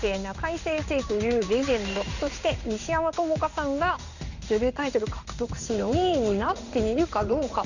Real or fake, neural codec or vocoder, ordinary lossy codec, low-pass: fake; codec, 16 kHz in and 24 kHz out, 1 kbps, XY-Tokenizer; none; 7.2 kHz